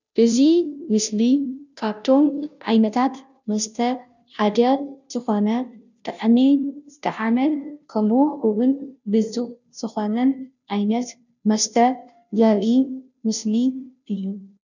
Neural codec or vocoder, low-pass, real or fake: codec, 16 kHz, 0.5 kbps, FunCodec, trained on Chinese and English, 25 frames a second; 7.2 kHz; fake